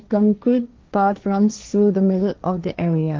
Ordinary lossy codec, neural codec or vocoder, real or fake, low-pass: Opus, 24 kbps; codec, 16 kHz, 1.1 kbps, Voila-Tokenizer; fake; 7.2 kHz